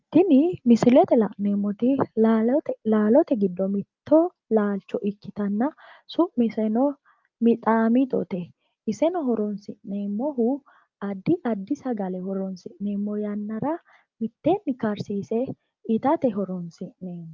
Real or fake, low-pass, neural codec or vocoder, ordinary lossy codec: real; 7.2 kHz; none; Opus, 24 kbps